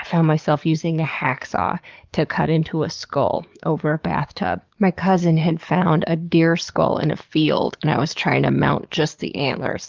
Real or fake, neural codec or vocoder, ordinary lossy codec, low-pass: fake; codec, 44.1 kHz, 7.8 kbps, DAC; Opus, 24 kbps; 7.2 kHz